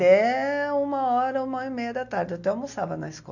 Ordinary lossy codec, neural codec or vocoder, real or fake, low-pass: none; none; real; 7.2 kHz